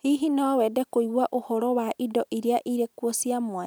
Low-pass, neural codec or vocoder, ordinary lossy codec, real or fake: none; none; none; real